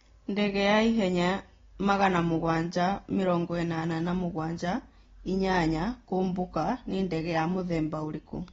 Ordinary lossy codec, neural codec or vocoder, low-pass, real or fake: AAC, 24 kbps; none; 7.2 kHz; real